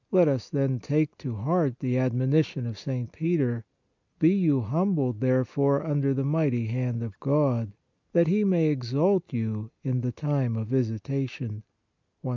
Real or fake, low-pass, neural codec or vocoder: real; 7.2 kHz; none